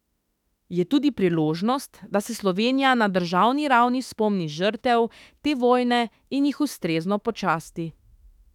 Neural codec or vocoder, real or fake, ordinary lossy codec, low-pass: autoencoder, 48 kHz, 32 numbers a frame, DAC-VAE, trained on Japanese speech; fake; none; 19.8 kHz